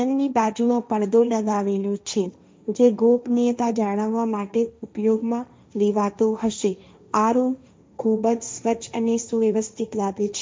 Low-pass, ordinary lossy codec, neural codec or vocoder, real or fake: none; none; codec, 16 kHz, 1.1 kbps, Voila-Tokenizer; fake